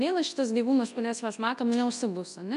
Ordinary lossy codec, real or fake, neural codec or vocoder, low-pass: MP3, 64 kbps; fake; codec, 24 kHz, 0.9 kbps, WavTokenizer, large speech release; 10.8 kHz